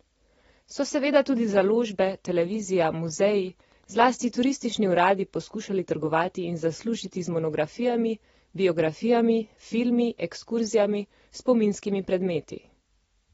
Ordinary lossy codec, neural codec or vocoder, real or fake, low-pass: AAC, 24 kbps; none; real; 19.8 kHz